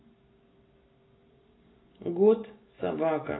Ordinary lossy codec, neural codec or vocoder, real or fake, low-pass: AAC, 16 kbps; none; real; 7.2 kHz